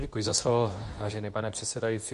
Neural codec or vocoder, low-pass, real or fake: codec, 24 kHz, 0.9 kbps, WavTokenizer, medium speech release version 2; 10.8 kHz; fake